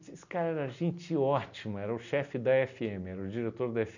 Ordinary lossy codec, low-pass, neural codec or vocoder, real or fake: none; 7.2 kHz; none; real